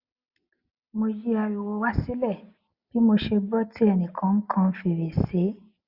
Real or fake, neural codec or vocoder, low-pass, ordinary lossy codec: real; none; 5.4 kHz; Opus, 64 kbps